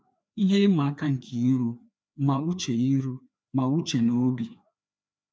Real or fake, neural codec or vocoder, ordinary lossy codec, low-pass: fake; codec, 16 kHz, 2 kbps, FreqCodec, larger model; none; none